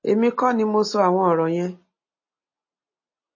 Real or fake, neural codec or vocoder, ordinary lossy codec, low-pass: real; none; MP3, 32 kbps; 7.2 kHz